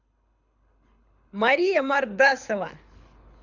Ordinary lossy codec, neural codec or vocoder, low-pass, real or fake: none; codec, 24 kHz, 6 kbps, HILCodec; 7.2 kHz; fake